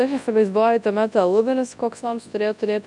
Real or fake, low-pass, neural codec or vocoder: fake; 10.8 kHz; codec, 24 kHz, 0.9 kbps, WavTokenizer, large speech release